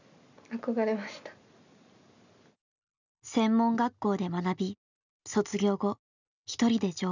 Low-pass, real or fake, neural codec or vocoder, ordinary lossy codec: 7.2 kHz; real; none; none